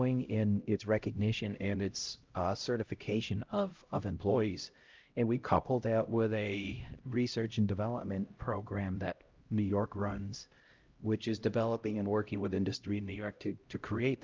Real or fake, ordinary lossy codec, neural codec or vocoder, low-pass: fake; Opus, 24 kbps; codec, 16 kHz, 0.5 kbps, X-Codec, HuBERT features, trained on LibriSpeech; 7.2 kHz